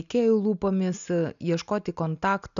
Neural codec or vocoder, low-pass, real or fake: none; 7.2 kHz; real